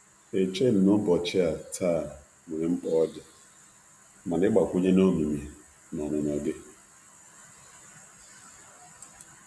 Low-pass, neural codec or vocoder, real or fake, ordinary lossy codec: none; none; real; none